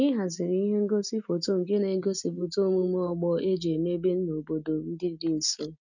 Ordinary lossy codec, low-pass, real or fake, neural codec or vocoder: none; 7.2 kHz; real; none